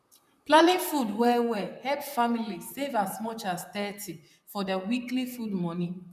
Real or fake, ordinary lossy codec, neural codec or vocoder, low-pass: fake; none; vocoder, 44.1 kHz, 128 mel bands, Pupu-Vocoder; 14.4 kHz